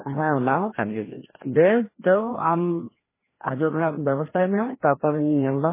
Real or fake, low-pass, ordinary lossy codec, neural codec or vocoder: fake; 3.6 kHz; MP3, 16 kbps; codec, 16 kHz, 1 kbps, FreqCodec, larger model